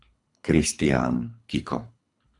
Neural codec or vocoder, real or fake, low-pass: codec, 24 kHz, 3 kbps, HILCodec; fake; 10.8 kHz